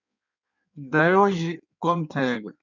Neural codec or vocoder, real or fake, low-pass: codec, 16 kHz in and 24 kHz out, 1.1 kbps, FireRedTTS-2 codec; fake; 7.2 kHz